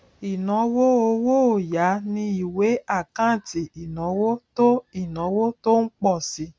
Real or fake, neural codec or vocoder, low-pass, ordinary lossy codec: real; none; none; none